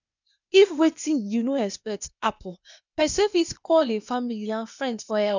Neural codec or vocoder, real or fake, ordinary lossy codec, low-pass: codec, 16 kHz, 0.8 kbps, ZipCodec; fake; none; 7.2 kHz